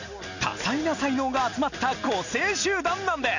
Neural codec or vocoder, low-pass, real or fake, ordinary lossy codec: none; 7.2 kHz; real; none